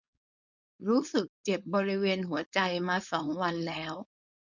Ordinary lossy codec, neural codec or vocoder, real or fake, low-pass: none; codec, 16 kHz, 4.8 kbps, FACodec; fake; 7.2 kHz